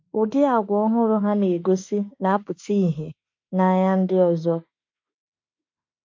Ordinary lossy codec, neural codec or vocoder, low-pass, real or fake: MP3, 48 kbps; autoencoder, 48 kHz, 32 numbers a frame, DAC-VAE, trained on Japanese speech; 7.2 kHz; fake